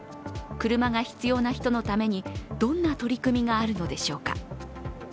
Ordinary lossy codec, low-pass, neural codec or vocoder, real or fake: none; none; none; real